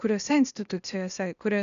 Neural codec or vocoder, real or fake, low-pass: codec, 16 kHz, 0.8 kbps, ZipCodec; fake; 7.2 kHz